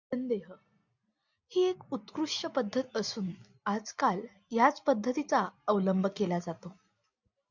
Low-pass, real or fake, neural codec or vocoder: 7.2 kHz; real; none